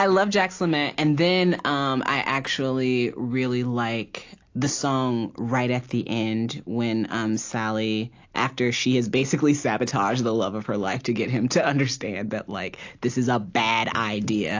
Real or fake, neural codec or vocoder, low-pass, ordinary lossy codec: real; none; 7.2 kHz; AAC, 48 kbps